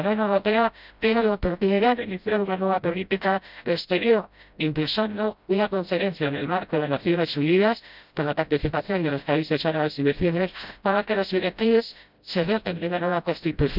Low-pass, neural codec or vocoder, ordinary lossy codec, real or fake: 5.4 kHz; codec, 16 kHz, 0.5 kbps, FreqCodec, smaller model; none; fake